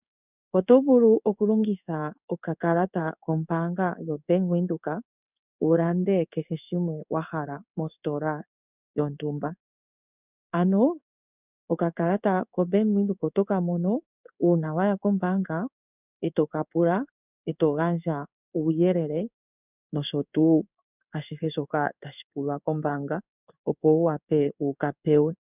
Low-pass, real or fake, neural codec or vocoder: 3.6 kHz; fake; codec, 16 kHz in and 24 kHz out, 1 kbps, XY-Tokenizer